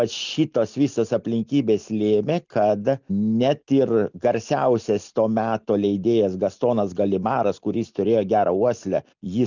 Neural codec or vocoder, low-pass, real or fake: none; 7.2 kHz; real